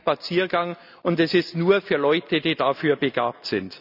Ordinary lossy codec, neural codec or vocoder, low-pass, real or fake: none; none; 5.4 kHz; real